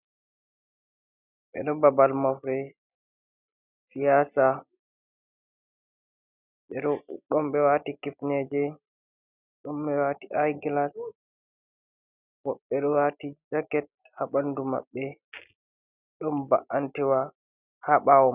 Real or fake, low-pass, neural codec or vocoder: real; 3.6 kHz; none